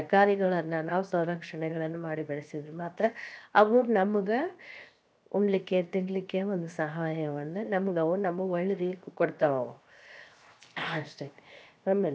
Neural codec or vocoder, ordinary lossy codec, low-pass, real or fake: codec, 16 kHz, 0.7 kbps, FocalCodec; none; none; fake